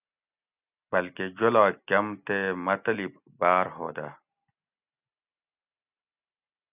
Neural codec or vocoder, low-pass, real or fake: none; 3.6 kHz; real